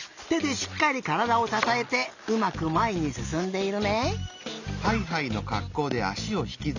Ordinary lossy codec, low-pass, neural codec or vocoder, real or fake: none; 7.2 kHz; none; real